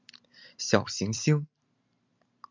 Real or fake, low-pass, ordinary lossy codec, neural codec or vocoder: fake; 7.2 kHz; MP3, 64 kbps; vocoder, 44.1 kHz, 128 mel bands every 256 samples, BigVGAN v2